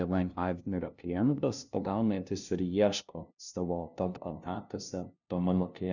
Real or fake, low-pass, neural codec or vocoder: fake; 7.2 kHz; codec, 16 kHz, 0.5 kbps, FunCodec, trained on LibriTTS, 25 frames a second